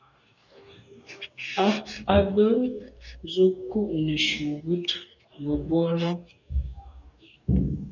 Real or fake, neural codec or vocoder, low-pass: fake; codec, 44.1 kHz, 2.6 kbps, DAC; 7.2 kHz